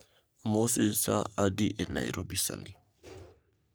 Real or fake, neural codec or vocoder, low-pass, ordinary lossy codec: fake; codec, 44.1 kHz, 3.4 kbps, Pupu-Codec; none; none